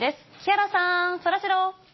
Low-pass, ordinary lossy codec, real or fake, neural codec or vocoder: 7.2 kHz; MP3, 24 kbps; real; none